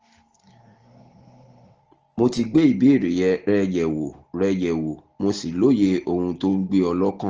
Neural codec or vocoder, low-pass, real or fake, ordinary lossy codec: none; 7.2 kHz; real; Opus, 16 kbps